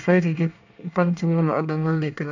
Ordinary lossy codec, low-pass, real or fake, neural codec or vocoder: none; 7.2 kHz; fake; codec, 24 kHz, 1 kbps, SNAC